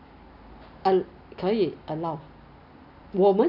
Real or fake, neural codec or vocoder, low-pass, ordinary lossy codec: real; none; 5.4 kHz; none